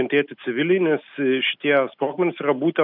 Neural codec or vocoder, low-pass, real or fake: none; 5.4 kHz; real